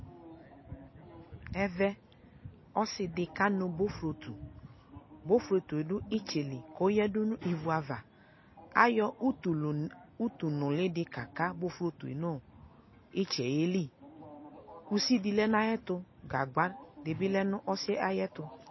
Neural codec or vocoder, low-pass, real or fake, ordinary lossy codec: none; 7.2 kHz; real; MP3, 24 kbps